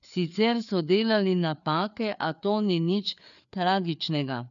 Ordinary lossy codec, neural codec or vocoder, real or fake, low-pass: none; codec, 16 kHz, 4 kbps, FreqCodec, larger model; fake; 7.2 kHz